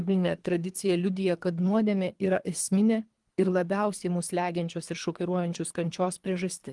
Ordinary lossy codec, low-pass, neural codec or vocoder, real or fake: Opus, 24 kbps; 10.8 kHz; codec, 24 kHz, 3 kbps, HILCodec; fake